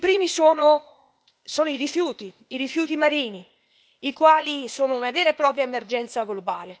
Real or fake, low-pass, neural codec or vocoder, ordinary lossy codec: fake; none; codec, 16 kHz, 0.8 kbps, ZipCodec; none